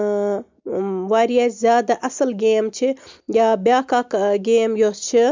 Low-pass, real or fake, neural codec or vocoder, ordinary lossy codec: 7.2 kHz; real; none; MP3, 64 kbps